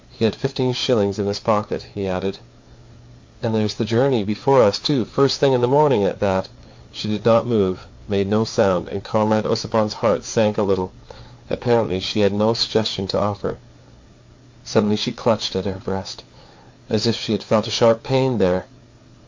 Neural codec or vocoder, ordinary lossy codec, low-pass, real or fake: codec, 16 kHz, 4 kbps, FreqCodec, larger model; MP3, 48 kbps; 7.2 kHz; fake